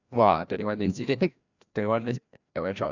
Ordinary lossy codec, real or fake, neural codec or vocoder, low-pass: none; fake; codec, 16 kHz, 1 kbps, FreqCodec, larger model; 7.2 kHz